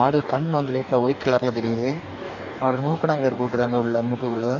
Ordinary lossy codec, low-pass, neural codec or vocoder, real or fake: none; 7.2 kHz; codec, 44.1 kHz, 2.6 kbps, DAC; fake